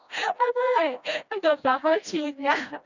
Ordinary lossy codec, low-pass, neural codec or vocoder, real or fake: none; 7.2 kHz; codec, 16 kHz, 1 kbps, FreqCodec, smaller model; fake